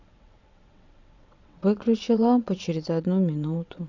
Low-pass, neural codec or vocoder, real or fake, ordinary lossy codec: 7.2 kHz; vocoder, 22.05 kHz, 80 mel bands, WaveNeXt; fake; none